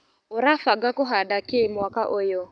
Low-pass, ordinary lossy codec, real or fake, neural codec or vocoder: 9.9 kHz; none; fake; codec, 44.1 kHz, 7.8 kbps, Pupu-Codec